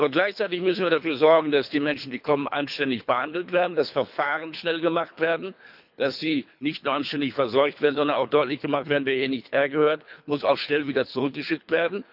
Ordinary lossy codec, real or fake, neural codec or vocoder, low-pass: none; fake; codec, 24 kHz, 3 kbps, HILCodec; 5.4 kHz